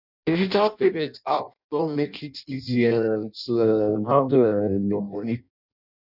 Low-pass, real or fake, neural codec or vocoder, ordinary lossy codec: 5.4 kHz; fake; codec, 16 kHz in and 24 kHz out, 0.6 kbps, FireRedTTS-2 codec; none